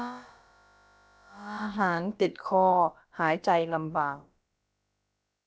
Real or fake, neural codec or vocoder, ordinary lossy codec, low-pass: fake; codec, 16 kHz, about 1 kbps, DyCAST, with the encoder's durations; none; none